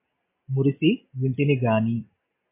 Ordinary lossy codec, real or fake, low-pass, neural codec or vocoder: MP3, 24 kbps; real; 3.6 kHz; none